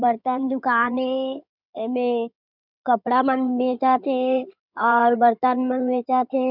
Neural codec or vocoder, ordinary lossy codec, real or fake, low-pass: codec, 16 kHz in and 24 kHz out, 2.2 kbps, FireRedTTS-2 codec; none; fake; 5.4 kHz